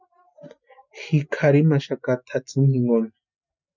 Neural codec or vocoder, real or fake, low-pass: none; real; 7.2 kHz